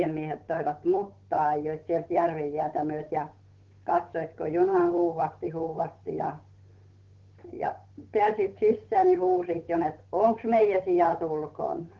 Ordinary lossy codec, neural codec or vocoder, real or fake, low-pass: Opus, 16 kbps; codec, 16 kHz, 8 kbps, FunCodec, trained on Chinese and English, 25 frames a second; fake; 7.2 kHz